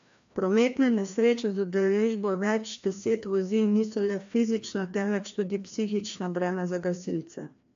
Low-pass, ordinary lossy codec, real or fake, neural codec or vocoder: 7.2 kHz; AAC, 64 kbps; fake; codec, 16 kHz, 1 kbps, FreqCodec, larger model